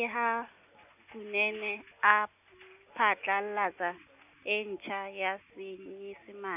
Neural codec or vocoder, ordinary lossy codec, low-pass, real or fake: none; none; 3.6 kHz; real